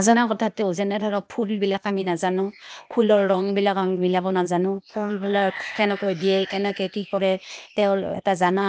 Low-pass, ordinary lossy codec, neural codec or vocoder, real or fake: none; none; codec, 16 kHz, 0.8 kbps, ZipCodec; fake